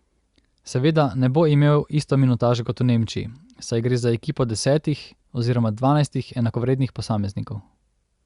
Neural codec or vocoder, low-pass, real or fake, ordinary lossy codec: none; 10.8 kHz; real; Opus, 64 kbps